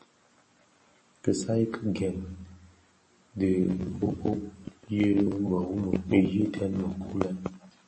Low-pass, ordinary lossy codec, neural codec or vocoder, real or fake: 10.8 kHz; MP3, 32 kbps; none; real